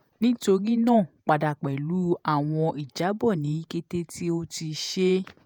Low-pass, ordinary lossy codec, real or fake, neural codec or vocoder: 19.8 kHz; none; fake; vocoder, 48 kHz, 128 mel bands, Vocos